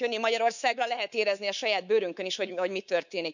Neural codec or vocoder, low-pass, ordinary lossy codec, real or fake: codec, 24 kHz, 3.1 kbps, DualCodec; 7.2 kHz; none; fake